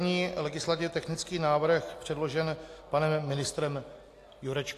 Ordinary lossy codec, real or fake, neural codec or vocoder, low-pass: AAC, 64 kbps; real; none; 14.4 kHz